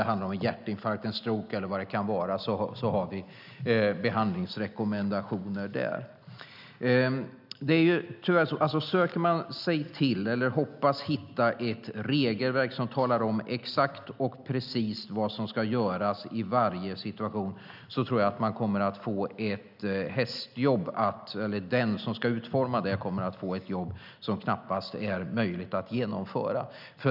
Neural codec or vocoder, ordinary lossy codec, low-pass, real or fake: none; none; 5.4 kHz; real